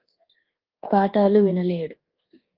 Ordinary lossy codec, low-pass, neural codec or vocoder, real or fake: Opus, 16 kbps; 5.4 kHz; codec, 24 kHz, 1.2 kbps, DualCodec; fake